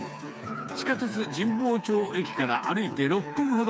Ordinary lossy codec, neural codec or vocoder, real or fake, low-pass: none; codec, 16 kHz, 4 kbps, FreqCodec, smaller model; fake; none